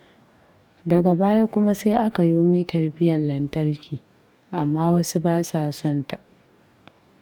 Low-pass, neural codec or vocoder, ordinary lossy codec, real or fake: 19.8 kHz; codec, 44.1 kHz, 2.6 kbps, DAC; none; fake